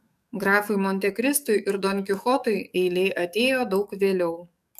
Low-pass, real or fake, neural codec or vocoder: 14.4 kHz; fake; codec, 44.1 kHz, 7.8 kbps, DAC